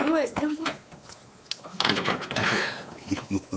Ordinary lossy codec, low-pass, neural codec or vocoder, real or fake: none; none; codec, 16 kHz, 2 kbps, X-Codec, WavLM features, trained on Multilingual LibriSpeech; fake